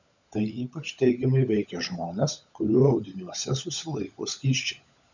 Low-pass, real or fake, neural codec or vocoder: 7.2 kHz; fake; codec, 16 kHz, 16 kbps, FunCodec, trained on LibriTTS, 50 frames a second